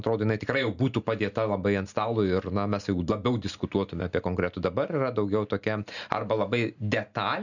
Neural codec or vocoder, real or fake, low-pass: none; real; 7.2 kHz